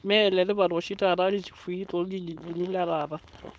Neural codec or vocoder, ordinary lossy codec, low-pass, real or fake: codec, 16 kHz, 4.8 kbps, FACodec; none; none; fake